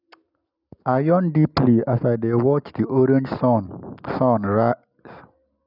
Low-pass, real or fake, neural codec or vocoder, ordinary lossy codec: 5.4 kHz; real; none; none